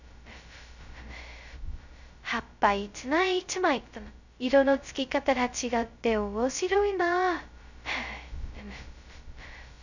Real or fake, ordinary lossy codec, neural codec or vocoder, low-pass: fake; none; codec, 16 kHz, 0.2 kbps, FocalCodec; 7.2 kHz